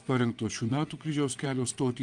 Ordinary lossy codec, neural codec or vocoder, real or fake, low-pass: Opus, 24 kbps; vocoder, 22.05 kHz, 80 mel bands, WaveNeXt; fake; 9.9 kHz